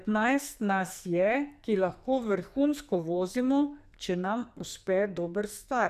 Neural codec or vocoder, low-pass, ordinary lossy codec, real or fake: codec, 44.1 kHz, 2.6 kbps, SNAC; 14.4 kHz; none; fake